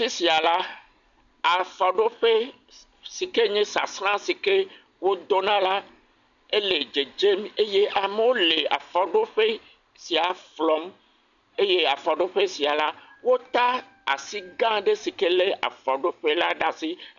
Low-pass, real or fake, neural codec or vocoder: 7.2 kHz; real; none